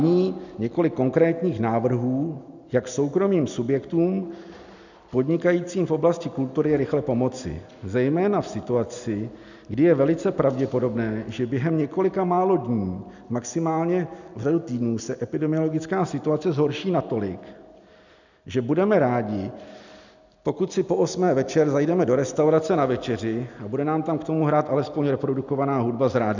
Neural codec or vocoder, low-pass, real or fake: none; 7.2 kHz; real